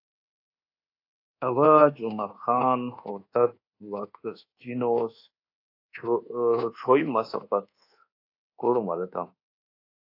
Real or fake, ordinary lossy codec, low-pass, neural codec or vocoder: fake; AAC, 32 kbps; 5.4 kHz; codec, 24 kHz, 1.2 kbps, DualCodec